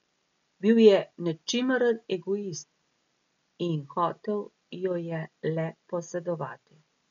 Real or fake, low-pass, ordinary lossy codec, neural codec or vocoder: real; 7.2 kHz; MP3, 48 kbps; none